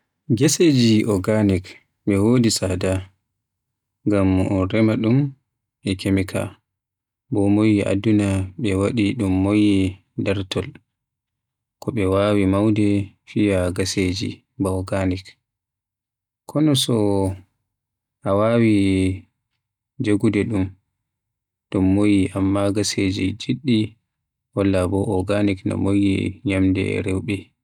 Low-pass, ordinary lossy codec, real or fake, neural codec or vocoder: 19.8 kHz; none; real; none